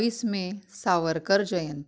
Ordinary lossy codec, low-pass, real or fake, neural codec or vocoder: none; none; real; none